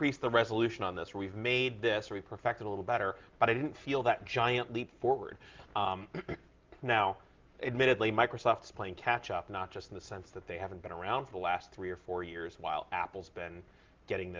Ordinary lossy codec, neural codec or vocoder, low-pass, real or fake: Opus, 32 kbps; none; 7.2 kHz; real